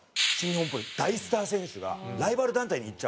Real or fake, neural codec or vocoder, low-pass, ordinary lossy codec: real; none; none; none